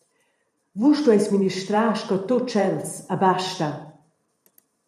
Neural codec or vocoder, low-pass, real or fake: vocoder, 44.1 kHz, 128 mel bands every 512 samples, BigVGAN v2; 14.4 kHz; fake